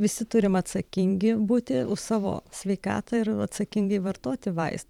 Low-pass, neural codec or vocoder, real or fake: 19.8 kHz; vocoder, 44.1 kHz, 128 mel bands every 512 samples, BigVGAN v2; fake